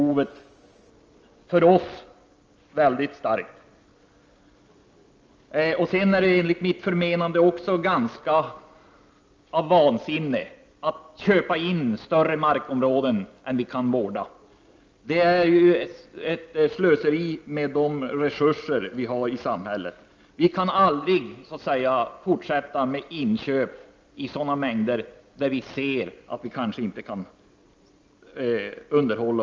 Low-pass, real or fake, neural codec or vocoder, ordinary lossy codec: 7.2 kHz; real; none; Opus, 24 kbps